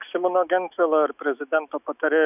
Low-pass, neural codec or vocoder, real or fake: 3.6 kHz; autoencoder, 48 kHz, 128 numbers a frame, DAC-VAE, trained on Japanese speech; fake